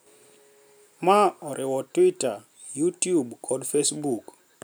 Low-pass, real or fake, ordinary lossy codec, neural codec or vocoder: none; real; none; none